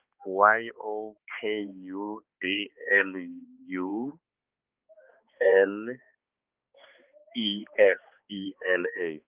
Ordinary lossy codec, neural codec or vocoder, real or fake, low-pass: Opus, 24 kbps; codec, 16 kHz, 2 kbps, X-Codec, HuBERT features, trained on balanced general audio; fake; 3.6 kHz